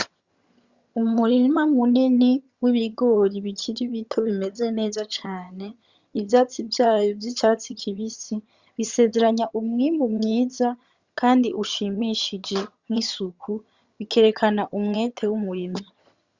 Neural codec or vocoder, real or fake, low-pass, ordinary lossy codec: vocoder, 22.05 kHz, 80 mel bands, HiFi-GAN; fake; 7.2 kHz; Opus, 64 kbps